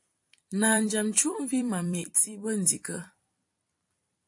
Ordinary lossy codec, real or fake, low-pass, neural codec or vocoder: AAC, 64 kbps; fake; 10.8 kHz; vocoder, 44.1 kHz, 128 mel bands every 256 samples, BigVGAN v2